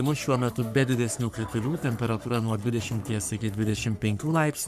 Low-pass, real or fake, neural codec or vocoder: 14.4 kHz; fake; codec, 44.1 kHz, 3.4 kbps, Pupu-Codec